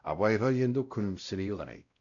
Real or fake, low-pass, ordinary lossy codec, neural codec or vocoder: fake; 7.2 kHz; none; codec, 16 kHz, 0.5 kbps, X-Codec, WavLM features, trained on Multilingual LibriSpeech